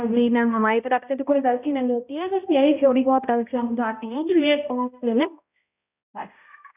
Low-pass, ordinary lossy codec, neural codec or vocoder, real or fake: 3.6 kHz; none; codec, 16 kHz, 0.5 kbps, X-Codec, HuBERT features, trained on balanced general audio; fake